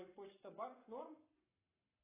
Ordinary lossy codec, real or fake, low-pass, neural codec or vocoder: AAC, 24 kbps; fake; 3.6 kHz; codec, 44.1 kHz, 7.8 kbps, Pupu-Codec